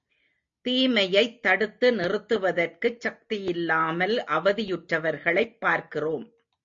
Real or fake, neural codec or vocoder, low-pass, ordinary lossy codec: real; none; 7.2 kHz; MP3, 48 kbps